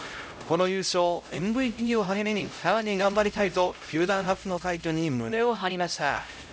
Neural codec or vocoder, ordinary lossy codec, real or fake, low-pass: codec, 16 kHz, 0.5 kbps, X-Codec, HuBERT features, trained on LibriSpeech; none; fake; none